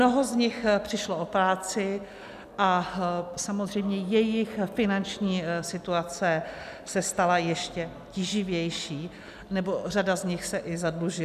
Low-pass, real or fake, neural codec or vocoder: 14.4 kHz; real; none